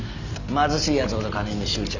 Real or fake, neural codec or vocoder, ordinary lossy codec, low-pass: real; none; none; 7.2 kHz